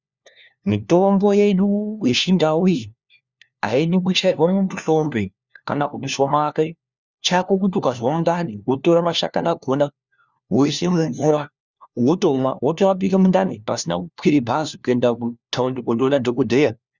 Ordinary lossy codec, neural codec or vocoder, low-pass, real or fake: Opus, 64 kbps; codec, 16 kHz, 1 kbps, FunCodec, trained on LibriTTS, 50 frames a second; 7.2 kHz; fake